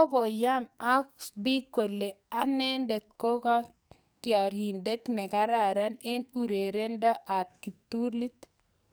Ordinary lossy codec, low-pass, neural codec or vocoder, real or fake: none; none; codec, 44.1 kHz, 2.6 kbps, SNAC; fake